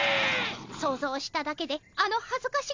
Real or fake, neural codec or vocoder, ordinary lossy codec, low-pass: real; none; MP3, 64 kbps; 7.2 kHz